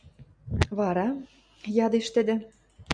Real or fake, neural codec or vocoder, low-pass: real; none; 9.9 kHz